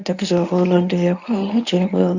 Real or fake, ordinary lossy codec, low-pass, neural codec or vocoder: fake; none; 7.2 kHz; codec, 24 kHz, 0.9 kbps, WavTokenizer, medium speech release version 1